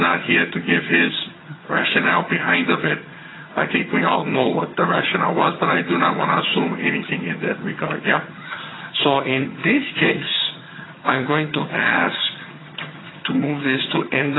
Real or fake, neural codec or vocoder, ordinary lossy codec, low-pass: fake; vocoder, 22.05 kHz, 80 mel bands, HiFi-GAN; AAC, 16 kbps; 7.2 kHz